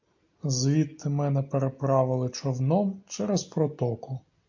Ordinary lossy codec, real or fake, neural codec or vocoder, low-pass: MP3, 48 kbps; real; none; 7.2 kHz